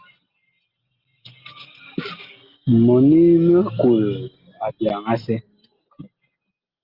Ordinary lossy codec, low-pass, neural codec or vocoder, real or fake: Opus, 32 kbps; 5.4 kHz; none; real